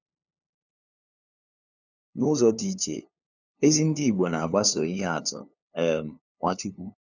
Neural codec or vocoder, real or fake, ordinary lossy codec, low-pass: codec, 16 kHz, 2 kbps, FunCodec, trained on LibriTTS, 25 frames a second; fake; none; 7.2 kHz